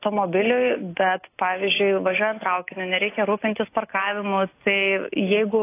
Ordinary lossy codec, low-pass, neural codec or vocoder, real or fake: AAC, 24 kbps; 3.6 kHz; none; real